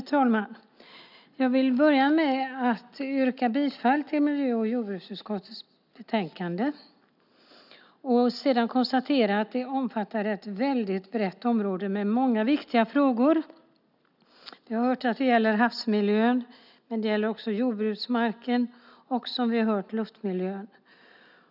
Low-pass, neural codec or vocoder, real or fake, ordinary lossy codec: 5.4 kHz; none; real; none